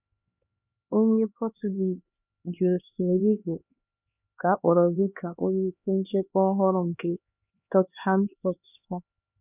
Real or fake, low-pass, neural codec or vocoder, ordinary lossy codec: fake; 3.6 kHz; codec, 16 kHz, 2 kbps, X-Codec, HuBERT features, trained on LibriSpeech; none